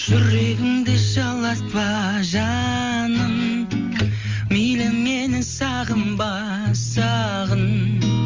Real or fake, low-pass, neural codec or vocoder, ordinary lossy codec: real; 7.2 kHz; none; Opus, 32 kbps